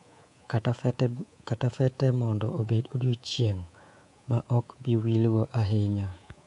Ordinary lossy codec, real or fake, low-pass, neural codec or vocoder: MP3, 64 kbps; fake; 10.8 kHz; codec, 24 kHz, 3.1 kbps, DualCodec